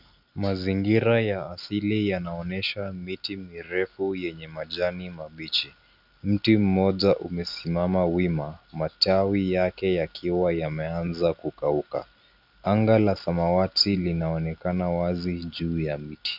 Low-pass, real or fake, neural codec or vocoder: 5.4 kHz; real; none